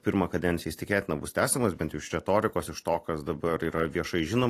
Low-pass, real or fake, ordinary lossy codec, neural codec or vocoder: 14.4 kHz; fake; AAC, 48 kbps; vocoder, 44.1 kHz, 128 mel bands every 256 samples, BigVGAN v2